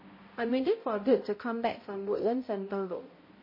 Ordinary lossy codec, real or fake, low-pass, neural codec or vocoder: MP3, 24 kbps; fake; 5.4 kHz; codec, 16 kHz, 0.5 kbps, X-Codec, HuBERT features, trained on balanced general audio